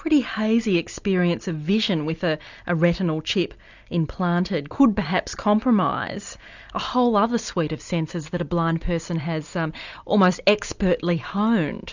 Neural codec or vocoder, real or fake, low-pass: none; real; 7.2 kHz